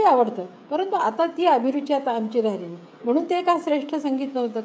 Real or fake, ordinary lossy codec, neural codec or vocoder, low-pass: fake; none; codec, 16 kHz, 16 kbps, FreqCodec, smaller model; none